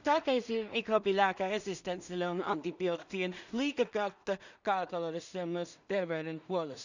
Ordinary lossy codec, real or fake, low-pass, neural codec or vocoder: none; fake; 7.2 kHz; codec, 16 kHz in and 24 kHz out, 0.4 kbps, LongCat-Audio-Codec, two codebook decoder